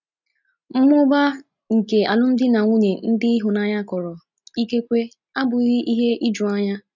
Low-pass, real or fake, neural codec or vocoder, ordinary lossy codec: 7.2 kHz; real; none; none